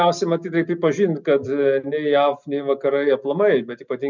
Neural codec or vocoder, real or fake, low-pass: none; real; 7.2 kHz